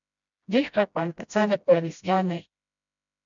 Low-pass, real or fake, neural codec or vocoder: 7.2 kHz; fake; codec, 16 kHz, 0.5 kbps, FreqCodec, smaller model